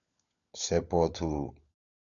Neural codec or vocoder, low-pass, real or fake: codec, 16 kHz, 16 kbps, FunCodec, trained on LibriTTS, 50 frames a second; 7.2 kHz; fake